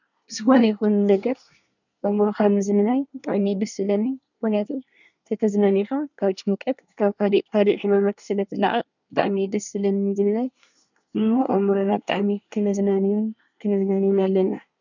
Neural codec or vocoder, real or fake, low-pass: codec, 24 kHz, 1 kbps, SNAC; fake; 7.2 kHz